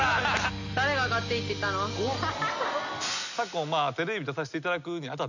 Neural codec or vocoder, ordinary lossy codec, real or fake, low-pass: none; none; real; 7.2 kHz